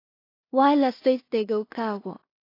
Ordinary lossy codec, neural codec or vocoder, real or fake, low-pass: AAC, 32 kbps; codec, 16 kHz in and 24 kHz out, 0.4 kbps, LongCat-Audio-Codec, two codebook decoder; fake; 5.4 kHz